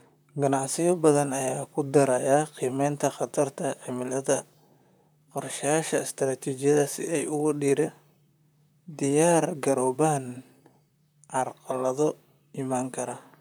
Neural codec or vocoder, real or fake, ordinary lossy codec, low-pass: vocoder, 44.1 kHz, 128 mel bands, Pupu-Vocoder; fake; none; none